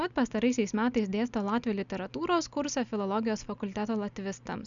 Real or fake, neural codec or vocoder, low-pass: real; none; 7.2 kHz